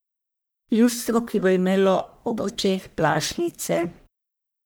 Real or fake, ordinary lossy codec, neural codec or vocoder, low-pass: fake; none; codec, 44.1 kHz, 1.7 kbps, Pupu-Codec; none